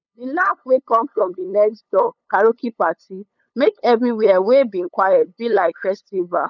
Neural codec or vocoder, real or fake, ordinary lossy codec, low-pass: codec, 16 kHz, 8 kbps, FunCodec, trained on LibriTTS, 25 frames a second; fake; none; 7.2 kHz